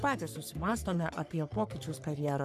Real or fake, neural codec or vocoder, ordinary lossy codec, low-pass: fake; codec, 44.1 kHz, 3.4 kbps, Pupu-Codec; MP3, 96 kbps; 14.4 kHz